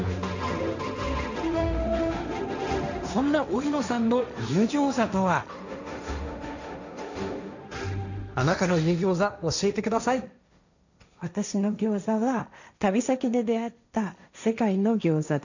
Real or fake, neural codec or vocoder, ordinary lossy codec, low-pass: fake; codec, 16 kHz, 1.1 kbps, Voila-Tokenizer; none; 7.2 kHz